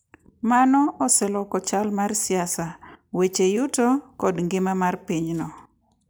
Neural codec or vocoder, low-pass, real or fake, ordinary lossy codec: none; none; real; none